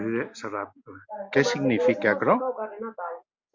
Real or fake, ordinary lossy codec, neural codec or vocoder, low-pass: real; MP3, 64 kbps; none; 7.2 kHz